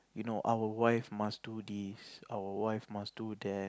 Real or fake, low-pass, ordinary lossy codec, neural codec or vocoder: real; none; none; none